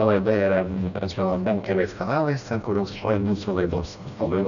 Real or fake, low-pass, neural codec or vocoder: fake; 7.2 kHz; codec, 16 kHz, 1 kbps, FreqCodec, smaller model